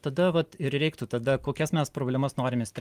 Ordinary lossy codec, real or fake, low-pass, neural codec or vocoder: Opus, 32 kbps; real; 14.4 kHz; none